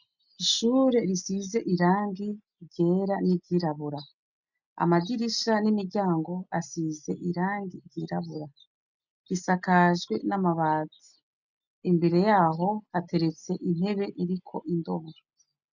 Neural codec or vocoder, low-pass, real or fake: none; 7.2 kHz; real